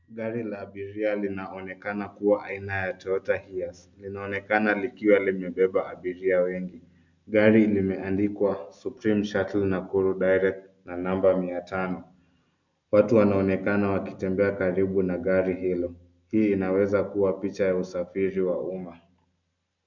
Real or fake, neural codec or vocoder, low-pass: real; none; 7.2 kHz